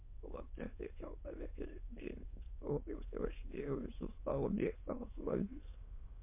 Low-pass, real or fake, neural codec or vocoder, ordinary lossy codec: 3.6 kHz; fake; autoencoder, 22.05 kHz, a latent of 192 numbers a frame, VITS, trained on many speakers; MP3, 24 kbps